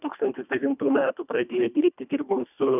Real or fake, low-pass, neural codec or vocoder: fake; 3.6 kHz; codec, 24 kHz, 1.5 kbps, HILCodec